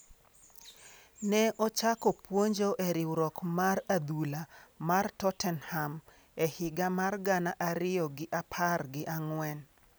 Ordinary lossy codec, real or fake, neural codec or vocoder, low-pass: none; real; none; none